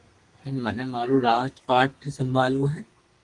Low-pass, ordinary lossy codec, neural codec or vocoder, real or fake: 10.8 kHz; Opus, 24 kbps; codec, 32 kHz, 1.9 kbps, SNAC; fake